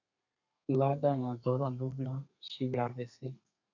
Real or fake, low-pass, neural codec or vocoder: fake; 7.2 kHz; codec, 32 kHz, 1.9 kbps, SNAC